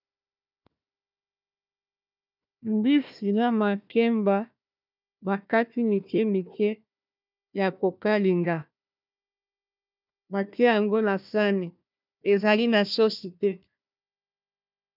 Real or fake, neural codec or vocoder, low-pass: fake; codec, 16 kHz, 1 kbps, FunCodec, trained on Chinese and English, 50 frames a second; 5.4 kHz